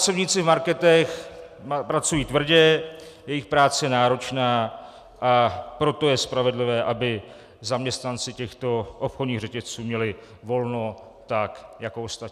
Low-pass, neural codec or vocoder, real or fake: 14.4 kHz; none; real